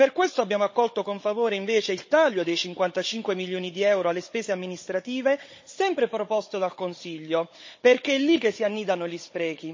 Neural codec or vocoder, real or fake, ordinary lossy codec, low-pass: codec, 16 kHz, 16 kbps, FunCodec, trained on Chinese and English, 50 frames a second; fake; MP3, 32 kbps; 7.2 kHz